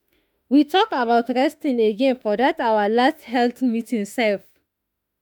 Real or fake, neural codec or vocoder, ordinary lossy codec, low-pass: fake; autoencoder, 48 kHz, 32 numbers a frame, DAC-VAE, trained on Japanese speech; none; none